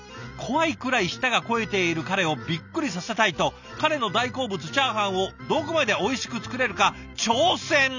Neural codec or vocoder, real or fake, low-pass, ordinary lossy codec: none; real; 7.2 kHz; none